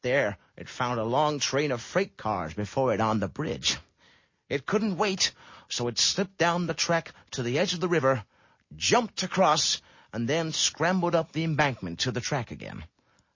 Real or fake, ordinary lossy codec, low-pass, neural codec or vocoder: real; MP3, 32 kbps; 7.2 kHz; none